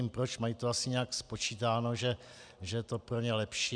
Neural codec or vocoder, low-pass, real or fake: none; 9.9 kHz; real